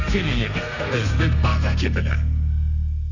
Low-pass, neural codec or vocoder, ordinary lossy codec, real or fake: 7.2 kHz; codec, 32 kHz, 1.9 kbps, SNAC; none; fake